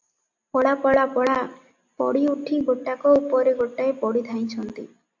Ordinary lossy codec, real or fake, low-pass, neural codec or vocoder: AAC, 48 kbps; real; 7.2 kHz; none